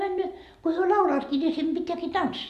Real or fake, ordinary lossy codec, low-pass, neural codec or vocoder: real; Opus, 64 kbps; 14.4 kHz; none